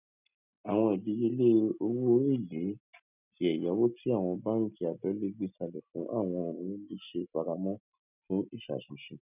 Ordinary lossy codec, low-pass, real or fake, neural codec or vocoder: none; 3.6 kHz; real; none